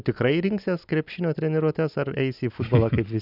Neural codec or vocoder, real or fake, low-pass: none; real; 5.4 kHz